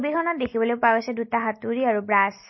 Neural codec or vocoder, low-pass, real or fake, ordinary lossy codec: none; 7.2 kHz; real; MP3, 24 kbps